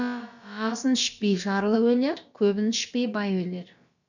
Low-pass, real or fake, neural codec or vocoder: 7.2 kHz; fake; codec, 16 kHz, about 1 kbps, DyCAST, with the encoder's durations